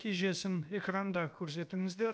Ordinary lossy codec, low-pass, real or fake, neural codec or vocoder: none; none; fake; codec, 16 kHz, about 1 kbps, DyCAST, with the encoder's durations